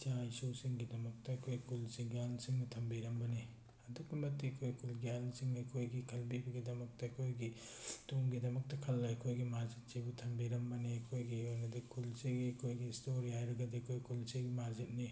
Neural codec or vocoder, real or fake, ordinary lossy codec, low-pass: none; real; none; none